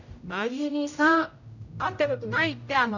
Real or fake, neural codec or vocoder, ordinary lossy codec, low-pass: fake; codec, 16 kHz, 0.5 kbps, X-Codec, HuBERT features, trained on general audio; none; 7.2 kHz